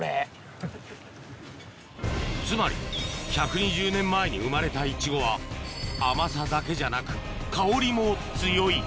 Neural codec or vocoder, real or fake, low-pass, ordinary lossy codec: none; real; none; none